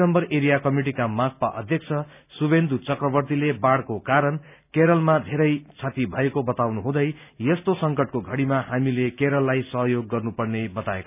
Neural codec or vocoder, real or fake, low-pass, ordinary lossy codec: none; real; 3.6 kHz; none